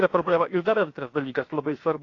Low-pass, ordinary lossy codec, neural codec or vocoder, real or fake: 7.2 kHz; AAC, 32 kbps; codec, 16 kHz, 0.7 kbps, FocalCodec; fake